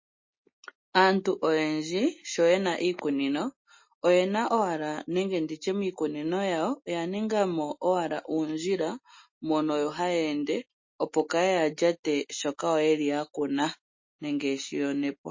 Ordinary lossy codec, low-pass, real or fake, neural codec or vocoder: MP3, 32 kbps; 7.2 kHz; real; none